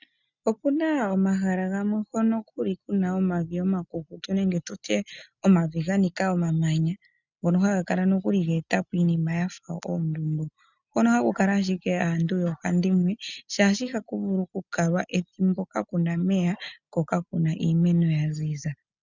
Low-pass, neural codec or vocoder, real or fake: 7.2 kHz; none; real